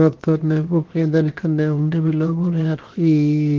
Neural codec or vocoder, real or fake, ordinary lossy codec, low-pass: codec, 16 kHz, 0.7 kbps, FocalCodec; fake; Opus, 16 kbps; 7.2 kHz